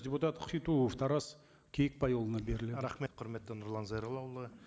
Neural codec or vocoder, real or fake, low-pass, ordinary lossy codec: none; real; none; none